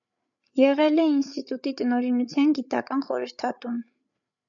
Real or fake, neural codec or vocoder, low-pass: fake; codec, 16 kHz, 8 kbps, FreqCodec, larger model; 7.2 kHz